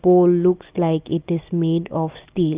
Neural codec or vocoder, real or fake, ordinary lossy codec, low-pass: none; real; Opus, 32 kbps; 3.6 kHz